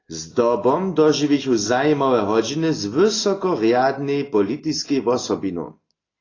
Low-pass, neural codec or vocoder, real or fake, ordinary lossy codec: 7.2 kHz; none; real; AAC, 32 kbps